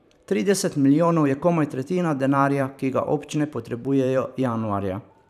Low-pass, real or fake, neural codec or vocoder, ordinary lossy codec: 14.4 kHz; fake; vocoder, 44.1 kHz, 128 mel bands every 512 samples, BigVGAN v2; none